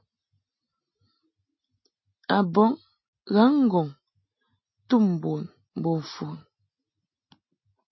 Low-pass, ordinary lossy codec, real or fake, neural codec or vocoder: 7.2 kHz; MP3, 24 kbps; real; none